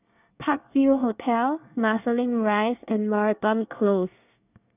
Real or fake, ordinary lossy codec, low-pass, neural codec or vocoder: fake; none; 3.6 kHz; codec, 24 kHz, 1 kbps, SNAC